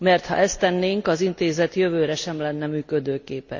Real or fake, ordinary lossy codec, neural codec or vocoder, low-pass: real; Opus, 64 kbps; none; 7.2 kHz